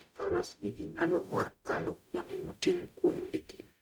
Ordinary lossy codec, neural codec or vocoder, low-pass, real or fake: none; codec, 44.1 kHz, 0.9 kbps, DAC; none; fake